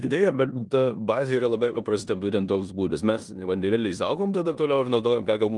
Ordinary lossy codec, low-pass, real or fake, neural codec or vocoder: Opus, 32 kbps; 10.8 kHz; fake; codec, 16 kHz in and 24 kHz out, 0.9 kbps, LongCat-Audio-Codec, four codebook decoder